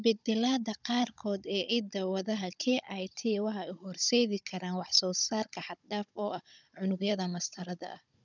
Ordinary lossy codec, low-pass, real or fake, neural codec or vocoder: none; 7.2 kHz; fake; codec, 16 kHz, 16 kbps, FunCodec, trained on Chinese and English, 50 frames a second